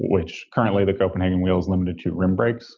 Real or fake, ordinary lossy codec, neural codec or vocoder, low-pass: real; Opus, 24 kbps; none; 7.2 kHz